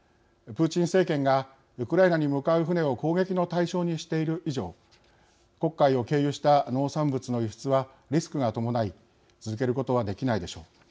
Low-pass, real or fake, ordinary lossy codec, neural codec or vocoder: none; real; none; none